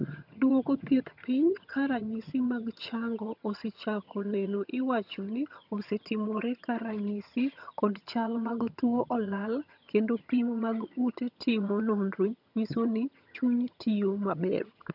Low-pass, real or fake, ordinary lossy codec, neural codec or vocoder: 5.4 kHz; fake; none; vocoder, 22.05 kHz, 80 mel bands, HiFi-GAN